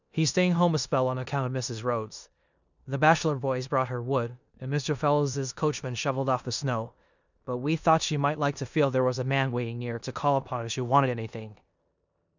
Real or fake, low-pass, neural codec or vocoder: fake; 7.2 kHz; codec, 16 kHz in and 24 kHz out, 0.9 kbps, LongCat-Audio-Codec, four codebook decoder